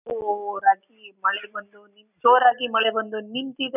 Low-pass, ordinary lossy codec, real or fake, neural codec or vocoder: 3.6 kHz; none; real; none